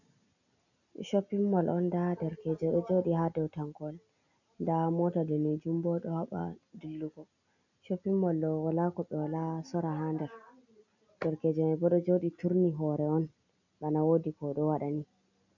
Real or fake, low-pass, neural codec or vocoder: real; 7.2 kHz; none